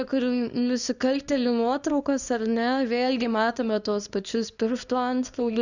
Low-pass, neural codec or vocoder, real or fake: 7.2 kHz; codec, 24 kHz, 0.9 kbps, WavTokenizer, small release; fake